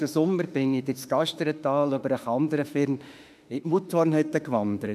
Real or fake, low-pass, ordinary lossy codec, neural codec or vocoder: fake; 14.4 kHz; none; autoencoder, 48 kHz, 32 numbers a frame, DAC-VAE, trained on Japanese speech